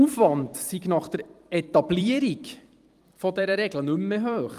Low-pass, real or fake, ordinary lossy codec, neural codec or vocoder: 14.4 kHz; fake; Opus, 32 kbps; vocoder, 44.1 kHz, 128 mel bands every 256 samples, BigVGAN v2